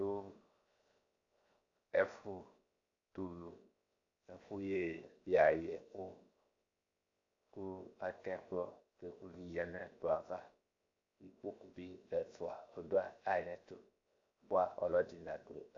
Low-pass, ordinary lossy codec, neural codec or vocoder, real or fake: 7.2 kHz; AAC, 64 kbps; codec, 16 kHz, 0.7 kbps, FocalCodec; fake